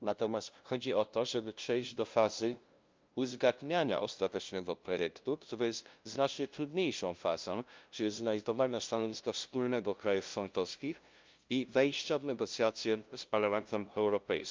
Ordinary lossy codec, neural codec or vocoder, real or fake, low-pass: Opus, 24 kbps; codec, 16 kHz, 0.5 kbps, FunCodec, trained on LibriTTS, 25 frames a second; fake; 7.2 kHz